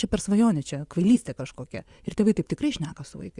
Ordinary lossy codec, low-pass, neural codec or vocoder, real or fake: Opus, 64 kbps; 10.8 kHz; vocoder, 24 kHz, 100 mel bands, Vocos; fake